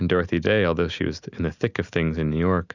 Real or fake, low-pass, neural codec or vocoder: real; 7.2 kHz; none